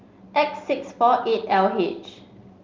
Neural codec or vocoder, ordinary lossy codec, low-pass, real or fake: none; Opus, 24 kbps; 7.2 kHz; real